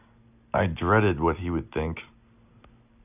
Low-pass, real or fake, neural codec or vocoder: 3.6 kHz; real; none